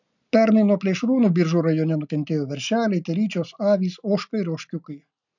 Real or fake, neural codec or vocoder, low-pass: real; none; 7.2 kHz